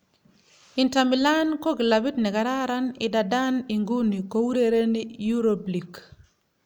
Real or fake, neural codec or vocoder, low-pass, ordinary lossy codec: real; none; none; none